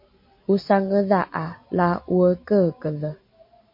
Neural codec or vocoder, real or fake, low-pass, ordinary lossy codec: none; real; 5.4 kHz; MP3, 32 kbps